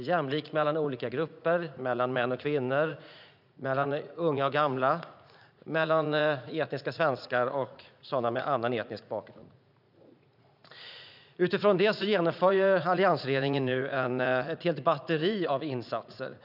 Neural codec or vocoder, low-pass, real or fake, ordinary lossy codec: vocoder, 44.1 kHz, 80 mel bands, Vocos; 5.4 kHz; fake; none